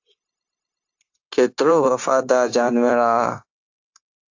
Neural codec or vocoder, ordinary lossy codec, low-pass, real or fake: codec, 16 kHz, 0.9 kbps, LongCat-Audio-Codec; AAC, 48 kbps; 7.2 kHz; fake